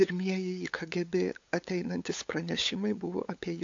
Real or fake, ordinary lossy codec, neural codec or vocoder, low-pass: fake; AAC, 48 kbps; codec, 16 kHz, 8 kbps, FunCodec, trained on LibriTTS, 25 frames a second; 7.2 kHz